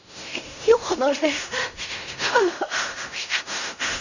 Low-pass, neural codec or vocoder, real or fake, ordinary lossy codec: 7.2 kHz; codec, 16 kHz in and 24 kHz out, 0.4 kbps, LongCat-Audio-Codec, fine tuned four codebook decoder; fake; none